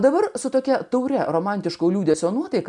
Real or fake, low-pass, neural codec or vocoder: real; 10.8 kHz; none